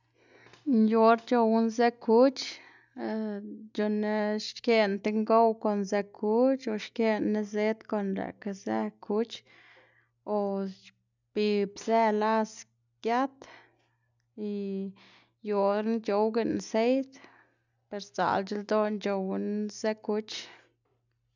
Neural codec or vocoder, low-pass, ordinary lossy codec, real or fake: none; 7.2 kHz; none; real